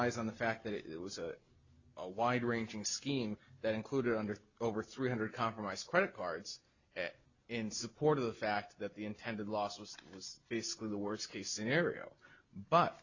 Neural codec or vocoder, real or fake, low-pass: none; real; 7.2 kHz